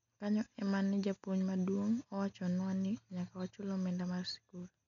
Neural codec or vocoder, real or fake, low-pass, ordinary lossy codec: none; real; 7.2 kHz; none